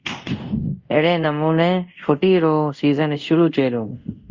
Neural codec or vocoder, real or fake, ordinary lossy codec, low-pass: codec, 24 kHz, 0.5 kbps, DualCodec; fake; Opus, 32 kbps; 7.2 kHz